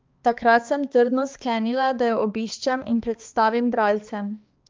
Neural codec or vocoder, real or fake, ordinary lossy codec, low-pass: codec, 16 kHz, 2 kbps, X-Codec, HuBERT features, trained on balanced general audio; fake; Opus, 32 kbps; 7.2 kHz